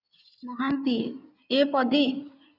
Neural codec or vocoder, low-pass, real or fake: codec, 16 kHz in and 24 kHz out, 2.2 kbps, FireRedTTS-2 codec; 5.4 kHz; fake